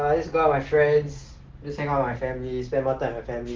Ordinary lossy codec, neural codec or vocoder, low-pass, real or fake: Opus, 16 kbps; none; 7.2 kHz; real